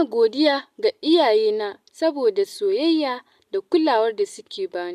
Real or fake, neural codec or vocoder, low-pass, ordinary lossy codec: real; none; 14.4 kHz; AAC, 96 kbps